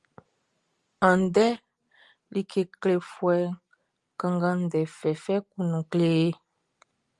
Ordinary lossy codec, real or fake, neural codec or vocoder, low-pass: Opus, 32 kbps; real; none; 10.8 kHz